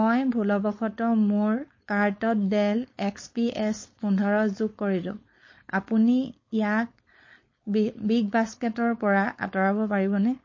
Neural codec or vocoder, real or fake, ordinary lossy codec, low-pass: codec, 16 kHz, 4.8 kbps, FACodec; fake; MP3, 32 kbps; 7.2 kHz